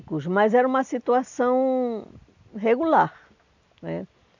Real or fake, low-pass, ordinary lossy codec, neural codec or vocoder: real; 7.2 kHz; none; none